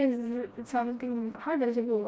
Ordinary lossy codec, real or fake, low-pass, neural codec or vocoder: none; fake; none; codec, 16 kHz, 1 kbps, FreqCodec, smaller model